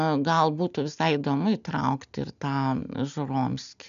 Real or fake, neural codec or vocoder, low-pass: real; none; 7.2 kHz